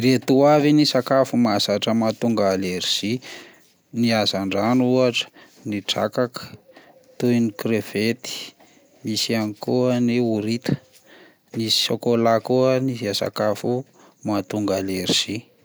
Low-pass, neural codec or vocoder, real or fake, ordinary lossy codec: none; none; real; none